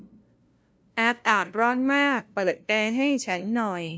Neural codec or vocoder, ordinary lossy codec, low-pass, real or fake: codec, 16 kHz, 0.5 kbps, FunCodec, trained on LibriTTS, 25 frames a second; none; none; fake